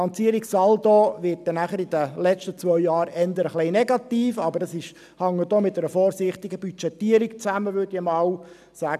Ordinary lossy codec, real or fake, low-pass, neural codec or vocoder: none; real; 14.4 kHz; none